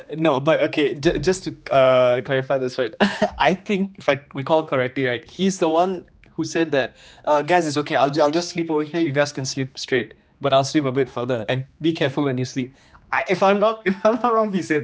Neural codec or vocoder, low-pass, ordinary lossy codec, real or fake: codec, 16 kHz, 2 kbps, X-Codec, HuBERT features, trained on general audio; none; none; fake